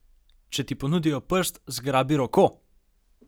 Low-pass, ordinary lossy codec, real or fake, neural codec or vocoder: none; none; real; none